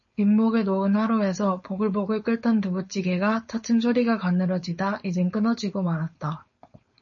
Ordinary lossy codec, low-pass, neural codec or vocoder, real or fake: MP3, 32 kbps; 7.2 kHz; codec, 16 kHz, 4.8 kbps, FACodec; fake